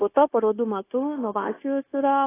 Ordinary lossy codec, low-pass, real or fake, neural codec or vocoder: AAC, 16 kbps; 3.6 kHz; fake; codec, 16 kHz, 0.9 kbps, LongCat-Audio-Codec